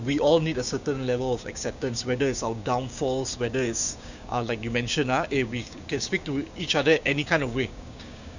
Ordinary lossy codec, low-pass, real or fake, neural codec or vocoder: none; 7.2 kHz; fake; codec, 16 kHz, 6 kbps, DAC